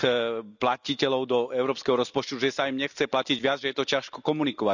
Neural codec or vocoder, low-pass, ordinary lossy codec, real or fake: none; 7.2 kHz; none; real